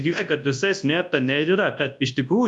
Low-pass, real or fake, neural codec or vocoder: 10.8 kHz; fake; codec, 24 kHz, 0.9 kbps, WavTokenizer, large speech release